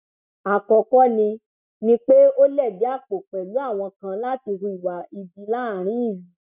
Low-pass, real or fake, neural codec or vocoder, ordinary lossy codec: 3.6 kHz; real; none; none